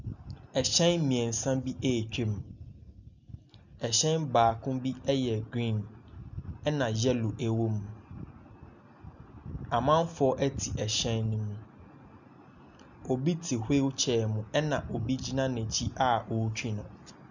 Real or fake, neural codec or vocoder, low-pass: real; none; 7.2 kHz